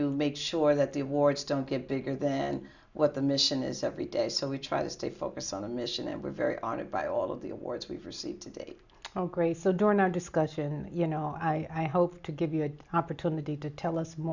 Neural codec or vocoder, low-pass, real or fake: none; 7.2 kHz; real